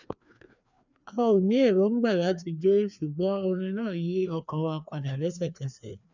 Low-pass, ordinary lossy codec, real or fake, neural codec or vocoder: 7.2 kHz; none; fake; codec, 16 kHz, 2 kbps, FreqCodec, larger model